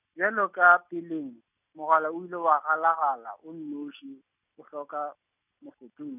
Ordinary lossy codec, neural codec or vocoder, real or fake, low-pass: none; none; real; 3.6 kHz